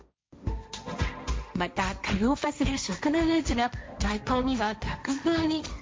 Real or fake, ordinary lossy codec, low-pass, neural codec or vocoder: fake; none; none; codec, 16 kHz, 1.1 kbps, Voila-Tokenizer